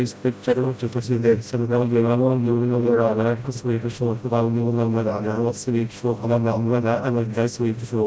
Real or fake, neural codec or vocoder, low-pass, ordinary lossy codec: fake; codec, 16 kHz, 0.5 kbps, FreqCodec, smaller model; none; none